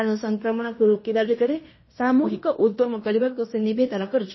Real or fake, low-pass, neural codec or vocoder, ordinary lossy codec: fake; 7.2 kHz; codec, 16 kHz in and 24 kHz out, 0.9 kbps, LongCat-Audio-Codec, fine tuned four codebook decoder; MP3, 24 kbps